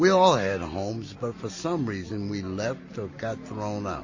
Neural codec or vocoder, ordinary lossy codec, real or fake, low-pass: none; MP3, 32 kbps; real; 7.2 kHz